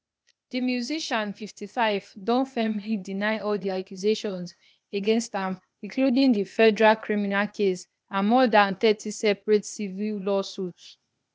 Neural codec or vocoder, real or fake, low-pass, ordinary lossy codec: codec, 16 kHz, 0.8 kbps, ZipCodec; fake; none; none